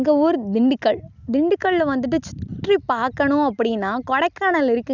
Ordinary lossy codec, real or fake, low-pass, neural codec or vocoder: none; real; 7.2 kHz; none